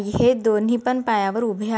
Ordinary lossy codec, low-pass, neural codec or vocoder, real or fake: none; none; none; real